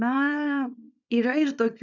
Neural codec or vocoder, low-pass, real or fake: codec, 24 kHz, 0.9 kbps, WavTokenizer, small release; 7.2 kHz; fake